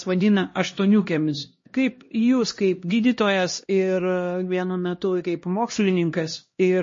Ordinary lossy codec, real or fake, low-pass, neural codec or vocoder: MP3, 32 kbps; fake; 7.2 kHz; codec, 16 kHz, 1 kbps, X-Codec, HuBERT features, trained on LibriSpeech